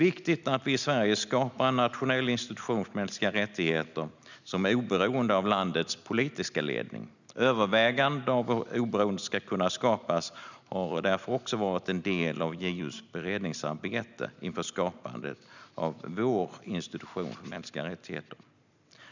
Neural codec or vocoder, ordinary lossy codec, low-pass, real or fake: none; none; 7.2 kHz; real